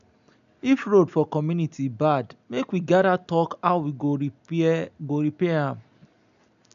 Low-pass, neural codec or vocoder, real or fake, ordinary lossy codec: 7.2 kHz; none; real; none